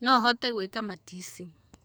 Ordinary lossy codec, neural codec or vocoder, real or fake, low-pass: none; codec, 44.1 kHz, 2.6 kbps, SNAC; fake; none